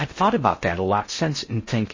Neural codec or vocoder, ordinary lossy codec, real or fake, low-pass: codec, 16 kHz in and 24 kHz out, 0.6 kbps, FocalCodec, streaming, 4096 codes; MP3, 32 kbps; fake; 7.2 kHz